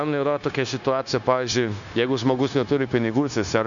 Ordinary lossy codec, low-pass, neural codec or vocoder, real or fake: MP3, 96 kbps; 7.2 kHz; codec, 16 kHz, 0.9 kbps, LongCat-Audio-Codec; fake